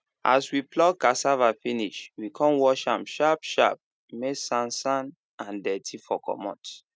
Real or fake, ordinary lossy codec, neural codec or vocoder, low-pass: real; none; none; none